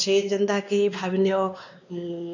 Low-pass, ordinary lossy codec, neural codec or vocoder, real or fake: 7.2 kHz; none; codec, 16 kHz, 2 kbps, X-Codec, WavLM features, trained on Multilingual LibriSpeech; fake